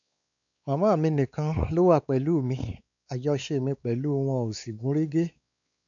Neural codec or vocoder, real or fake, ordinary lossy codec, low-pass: codec, 16 kHz, 4 kbps, X-Codec, WavLM features, trained on Multilingual LibriSpeech; fake; none; 7.2 kHz